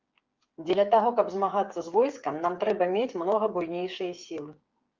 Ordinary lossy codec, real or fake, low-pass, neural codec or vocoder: Opus, 24 kbps; fake; 7.2 kHz; codec, 16 kHz, 8 kbps, FreqCodec, smaller model